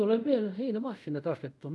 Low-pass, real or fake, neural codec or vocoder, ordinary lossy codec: none; fake; codec, 24 kHz, 0.5 kbps, DualCodec; none